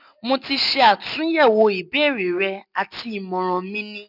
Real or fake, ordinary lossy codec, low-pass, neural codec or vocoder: real; none; 5.4 kHz; none